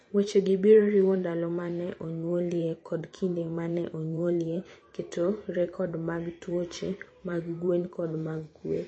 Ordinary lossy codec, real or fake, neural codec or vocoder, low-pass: MP3, 32 kbps; fake; vocoder, 44.1 kHz, 128 mel bands every 512 samples, BigVGAN v2; 9.9 kHz